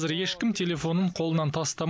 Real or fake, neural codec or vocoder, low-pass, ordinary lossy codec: real; none; none; none